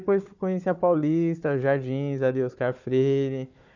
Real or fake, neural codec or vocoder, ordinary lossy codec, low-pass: fake; codec, 16 kHz, 4 kbps, FunCodec, trained on Chinese and English, 50 frames a second; none; 7.2 kHz